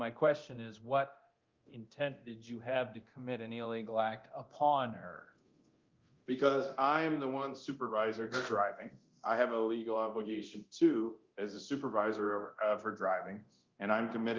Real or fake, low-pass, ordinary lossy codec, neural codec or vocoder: fake; 7.2 kHz; Opus, 32 kbps; codec, 24 kHz, 0.9 kbps, DualCodec